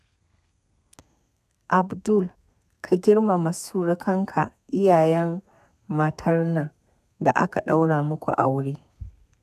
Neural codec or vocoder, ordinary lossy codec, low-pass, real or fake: codec, 32 kHz, 1.9 kbps, SNAC; none; 14.4 kHz; fake